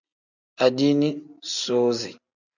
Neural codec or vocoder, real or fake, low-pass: none; real; 7.2 kHz